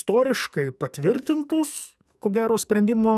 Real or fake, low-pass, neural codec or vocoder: fake; 14.4 kHz; codec, 44.1 kHz, 2.6 kbps, SNAC